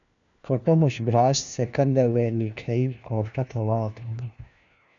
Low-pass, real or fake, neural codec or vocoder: 7.2 kHz; fake; codec, 16 kHz, 1 kbps, FunCodec, trained on LibriTTS, 50 frames a second